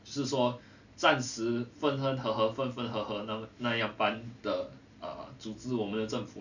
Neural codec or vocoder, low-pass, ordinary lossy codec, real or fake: none; 7.2 kHz; none; real